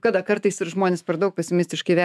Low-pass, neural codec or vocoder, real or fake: 14.4 kHz; autoencoder, 48 kHz, 128 numbers a frame, DAC-VAE, trained on Japanese speech; fake